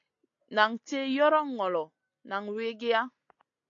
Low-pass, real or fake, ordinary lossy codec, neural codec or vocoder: 7.2 kHz; real; AAC, 48 kbps; none